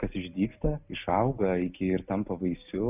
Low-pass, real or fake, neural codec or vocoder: 3.6 kHz; real; none